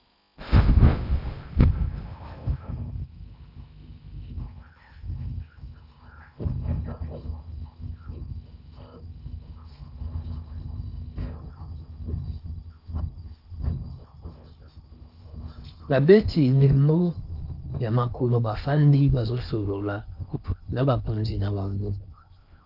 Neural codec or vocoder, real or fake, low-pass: codec, 16 kHz in and 24 kHz out, 0.6 kbps, FocalCodec, streaming, 4096 codes; fake; 5.4 kHz